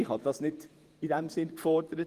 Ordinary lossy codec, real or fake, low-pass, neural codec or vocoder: Opus, 24 kbps; fake; 14.4 kHz; vocoder, 48 kHz, 128 mel bands, Vocos